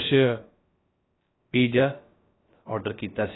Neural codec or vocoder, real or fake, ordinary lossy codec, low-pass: codec, 16 kHz, about 1 kbps, DyCAST, with the encoder's durations; fake; AAC, 16 kbps; 7.2 kHz